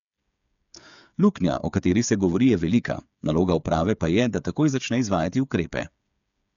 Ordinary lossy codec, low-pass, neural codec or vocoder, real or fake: MP3, 96 kbps; 7.2 kHz; codec, 16 kHz, 8 kbps, FreqCodec, smaller model; fake